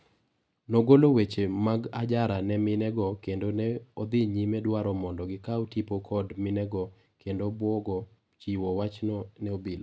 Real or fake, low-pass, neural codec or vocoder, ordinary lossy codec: real; none; none; none